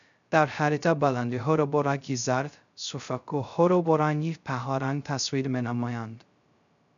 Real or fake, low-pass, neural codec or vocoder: fake; 7.2 kHz; codec, 16 kHz, 0.2 kbps, FocalCodec